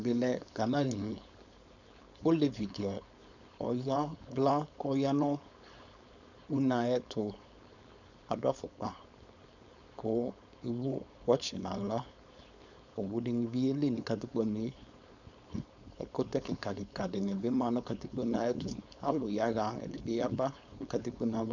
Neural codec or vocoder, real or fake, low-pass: codec, 16 kHz, 4.8 kbps, FACodec; fake; 7.2 kHz